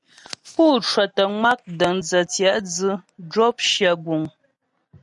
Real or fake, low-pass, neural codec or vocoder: real; 10.8 kHz; none